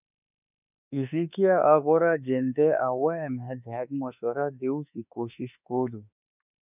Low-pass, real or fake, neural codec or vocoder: 3.6 kHz; fake; autoencoder, 48 kHz, 32 numbers a frame, DAC-VAE, trained on Japanese speech